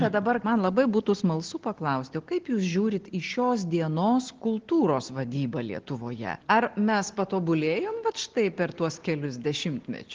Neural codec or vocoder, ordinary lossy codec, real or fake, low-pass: none; Opus, 16 kbps; real; 7.2 kHz